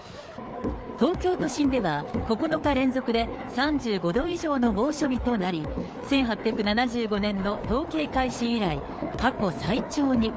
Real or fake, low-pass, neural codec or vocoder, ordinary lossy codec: fake; none; codec, 16 kHz, 4 kbps, FreqCodec, larger model; none